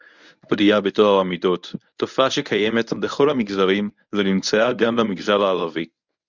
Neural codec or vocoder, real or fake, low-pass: codec, 24 kHz, 0.9 kbps, WavTokenizer, medium speech release version 1; fake; 7.2 kHz